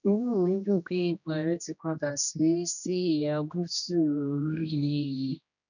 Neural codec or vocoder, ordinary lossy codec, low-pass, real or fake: codec, 16 kHz, 1 kbps, X-Codec, HuBERT features, trained on general audio; none; 7.2 kHz; fake